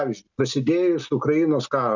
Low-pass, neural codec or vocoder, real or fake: 7.2 kHz; none; real